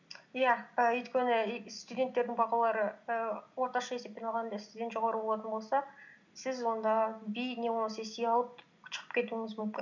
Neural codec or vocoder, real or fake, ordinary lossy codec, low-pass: none; real; none; 7.2 kHz